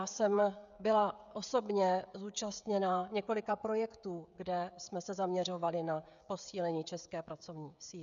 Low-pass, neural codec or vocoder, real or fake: 7.2 kHz; codec, 16 kHz, 16 kbps, FreqCodec, smaller model; fake